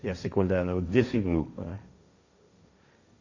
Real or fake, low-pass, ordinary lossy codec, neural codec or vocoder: fake; 7.2 kHz; Opus, 64 kbps; codec, 16 kHz, 1.1 kbps, Voila-Tokenizer